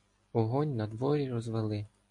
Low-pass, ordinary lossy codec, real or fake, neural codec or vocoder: 10.8 kHz; AAC, 64 kbps; real; none